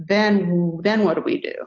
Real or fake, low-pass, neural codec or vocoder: real; 7.2 kHz; none